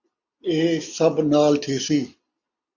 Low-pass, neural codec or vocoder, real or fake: 7.2 kHz; none; real